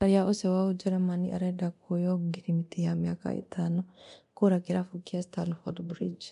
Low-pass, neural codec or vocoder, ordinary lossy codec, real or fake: 10.8 kHz; codec, 24 kHz, 0.9 kbps, DualCodec; AAC, 96 kbps; fake